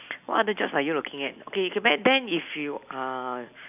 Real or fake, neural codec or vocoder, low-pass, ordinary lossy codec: real; none; 3.6 kHz; none